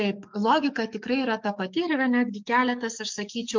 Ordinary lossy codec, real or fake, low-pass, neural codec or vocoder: MP3, 64 kbps; fake; 7.2 kHz; codec, 44.1 kHz, 7.8 kbps, DAC